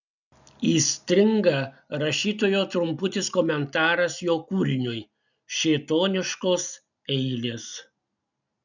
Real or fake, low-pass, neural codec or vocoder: real; 7.2 kHz; none